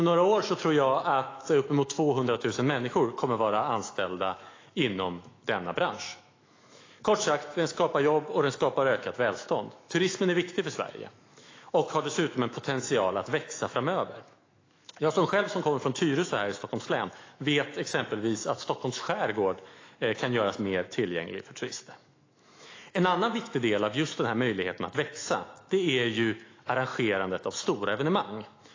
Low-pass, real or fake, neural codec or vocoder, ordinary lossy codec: 7.2 kHz; fake; autoencoder, 48 kHz, 128 numbers a frame, DAC-VAE, trained on Japanese speech; AAC, 32 kbps